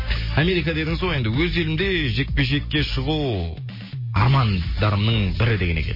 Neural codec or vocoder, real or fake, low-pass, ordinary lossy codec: none; real; 5.4 kHz; MP3, 24 kbps